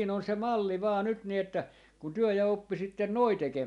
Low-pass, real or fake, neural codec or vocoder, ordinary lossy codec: 10.8 kHz; real; none; none